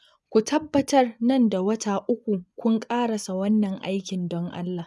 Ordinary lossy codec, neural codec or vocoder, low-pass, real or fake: none; vocoder, 24 kHz, 100 mel bands, Vocos; none; fake